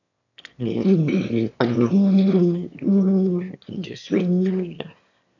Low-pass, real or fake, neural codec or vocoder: 7.2 kHz; fake; autoencoder, 22.05 kHz, a latent of 192 numbers a frame, VITS, trained on one speaker